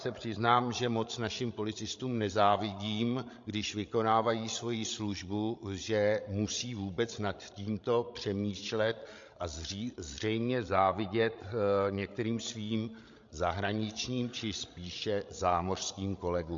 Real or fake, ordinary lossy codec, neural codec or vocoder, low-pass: fake; MP3, 48 kbps; codec, 16 kHz, 16 kbps, FreqCodec, larger model; 7.2 kHz